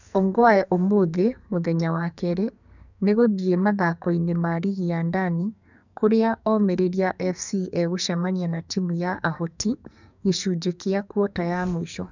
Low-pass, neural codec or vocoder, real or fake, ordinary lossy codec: 7.2 kHz; codec, 44.1 kHz, 2.6 kbps, SNAC; fake; none